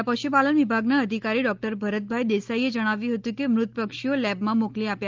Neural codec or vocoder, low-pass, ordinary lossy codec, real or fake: none; 7.2 kHz; Opus, 32 kbps; real